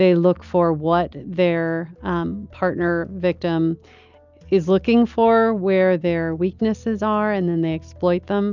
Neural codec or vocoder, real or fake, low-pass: none; real; 7.2 kHz